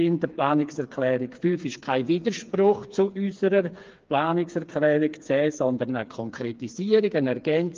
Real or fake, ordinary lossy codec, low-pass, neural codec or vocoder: fake; Opus, 32 kbps; 7.2 kHz; codec, 16 kHz, 4 kbps, FreqCodec, smaller model